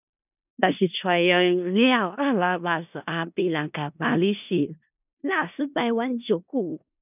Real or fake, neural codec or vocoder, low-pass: fake; codec, 16 kHz in and 24 kHz out, 0.4 kbps, LongCat-Audio-Codec, four codebook decoder; 3.6 kHz